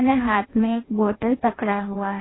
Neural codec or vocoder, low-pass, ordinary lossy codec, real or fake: codec, 16 kHz in and 24 kHz out, 0.6 kbps, FireRedTTS-2 codec; 7.2 kHz; AAC, 16 kbps; fake